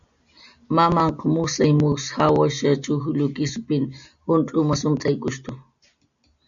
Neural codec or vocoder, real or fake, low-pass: none; real; 7.2 kHz